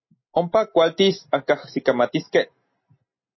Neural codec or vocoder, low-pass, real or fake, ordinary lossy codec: none; 7.2 kHz; real; MP3, 24 kbps